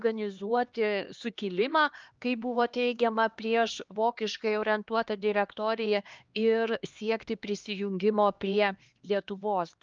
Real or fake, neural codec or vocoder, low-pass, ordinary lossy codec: fake; codec, 16 kHz, 2 kbps, X-Codec, HuBERT features, trained on LibriSpeech; 7.2 kHz; Opus, 24 kbps